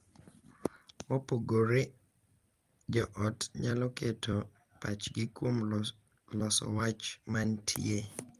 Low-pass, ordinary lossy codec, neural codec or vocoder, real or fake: 14.4 kHz; Opus, 32 kbps; vocoder, 44.1 kHz, 128 mel bands every 256 samples, BigVGAN v2; fake